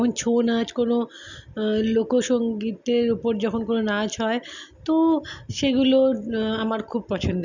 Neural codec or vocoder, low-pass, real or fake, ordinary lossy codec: none; 7.2 kHz; real; none